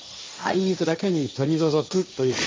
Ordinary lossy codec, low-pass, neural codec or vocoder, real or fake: none; none; codec, 16 kHz, 1.1 kbps, Voila-Tokenizer; fake